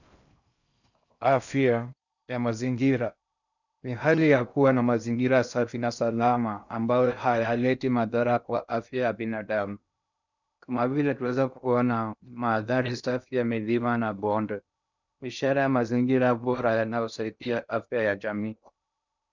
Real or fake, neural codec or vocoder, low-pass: fake; codec, 16 kHz in and 24 kHz out, 0.6 kbps, FocalCodec, streaming, 2048 codes; 7.2 kHz